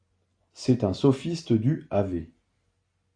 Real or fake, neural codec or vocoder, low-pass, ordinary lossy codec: real; none; 9.9 kHz; AAC, 48 kbps